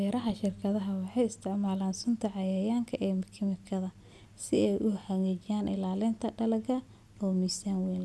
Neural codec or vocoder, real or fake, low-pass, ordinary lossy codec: none; real; none; none